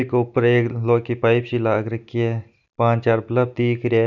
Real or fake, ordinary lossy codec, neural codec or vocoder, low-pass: real; none; none; 7.2 kHz